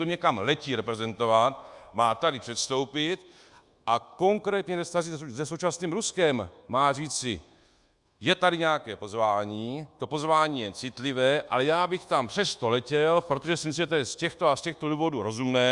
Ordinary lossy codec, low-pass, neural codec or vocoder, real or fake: Opus, 64 kbps; 10.8 kHz; codec, 24 kHz, 1.2 kbps, DualCodec; fake